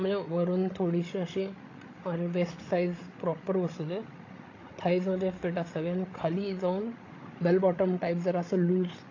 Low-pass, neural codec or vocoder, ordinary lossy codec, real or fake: 7.2 kHz; codec, 16 kHz, 16 kbps, FreqCodec, larger model; AAC, 32 kbps; fake